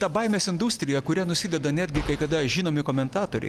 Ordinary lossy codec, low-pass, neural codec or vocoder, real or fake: Opus, 32 kbps; 14.4 kHz; none; real